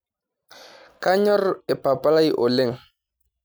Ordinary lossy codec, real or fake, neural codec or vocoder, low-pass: none; real; none; none